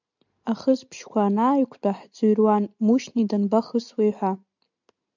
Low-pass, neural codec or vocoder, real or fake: 7.2 kHz; none; real